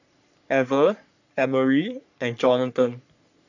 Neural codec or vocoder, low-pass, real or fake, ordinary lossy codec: codec, 44.1 kHz, 3.4 kbps, Pupu-Codec; 7.2 kHz; fake; none